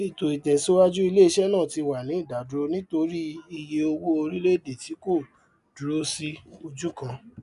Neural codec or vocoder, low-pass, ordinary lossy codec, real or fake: none; 10.8 kHz; none; real